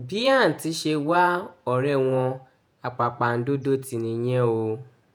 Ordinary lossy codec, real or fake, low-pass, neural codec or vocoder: none; fake; none; vocoder, 48 kHz, 128 mel bands, Vocos